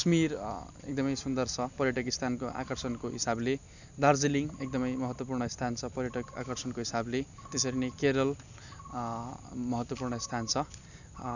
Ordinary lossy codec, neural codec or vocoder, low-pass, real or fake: none; none; 7.2 kHz; real